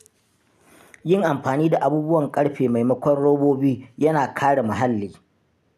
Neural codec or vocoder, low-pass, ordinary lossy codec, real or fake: none; 14.4 kHz; none; real